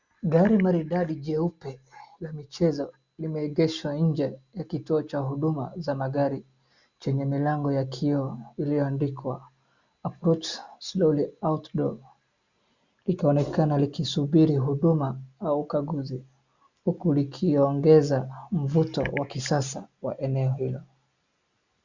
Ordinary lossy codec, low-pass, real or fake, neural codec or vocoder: Opus, 64 kbps; 7.2 kHz; real; none